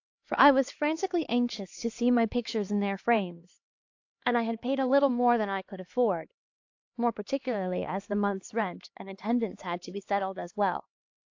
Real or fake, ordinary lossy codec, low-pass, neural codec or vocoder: fake; AAC, 48 kbps; 7.2 kHz; codec, 16 kHz, 2 kbps, X-Codec, HuBERT features, trained on LibriSpeech